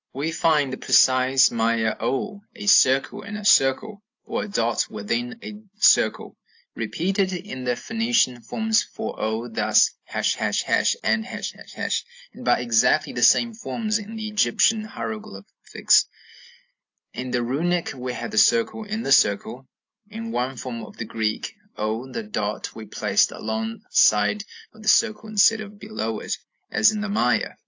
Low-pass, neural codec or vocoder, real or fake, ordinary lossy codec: 7.2 kHz; none; real; AAC, 48 kbps